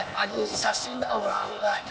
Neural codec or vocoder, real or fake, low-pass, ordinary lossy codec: codec, 16 kHz, 0.8 kbps, ZipCodec; fake; none; none